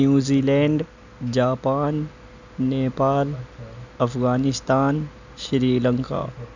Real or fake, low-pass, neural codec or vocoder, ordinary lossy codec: real; 7.2 kHz; none; none